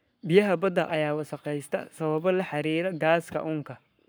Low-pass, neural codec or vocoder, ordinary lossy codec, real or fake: none; codec, 44.1 kHz, 7.8 kbps, Pupu-Codec; none; fake